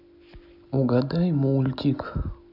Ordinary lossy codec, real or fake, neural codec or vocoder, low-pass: none; real; none; 5.4 kHz